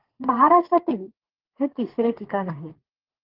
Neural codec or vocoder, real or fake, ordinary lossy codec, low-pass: codec, 32 kHz, 1.9 kbps, SNAC; fake; Opus, 16 kbps; 5.4 kHz